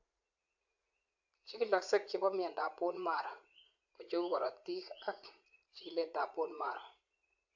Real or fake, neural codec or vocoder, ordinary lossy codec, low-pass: fake; vocoder, 44.1 kHz, 80 mel bands, Vocos; AAC, 48 kbps; 7.2 kHz